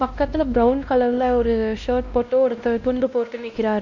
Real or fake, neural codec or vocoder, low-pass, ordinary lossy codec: fake; codec, 16 kHz, 1 kbps, X-Codec, WavLM features, trained on Multilingual LibriSpeech; 7.2 kHz; none